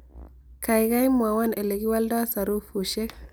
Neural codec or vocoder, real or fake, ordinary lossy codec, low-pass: none; real; none; none